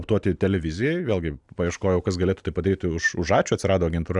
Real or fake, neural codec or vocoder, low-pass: real; none; 10.8 kHz